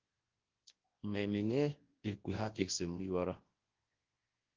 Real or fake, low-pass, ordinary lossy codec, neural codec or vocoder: fake; 7.2 kHz; Opus, 16 kbps; codec, 24 kHz, 0.9 kbps, WavTokenizer, large speech release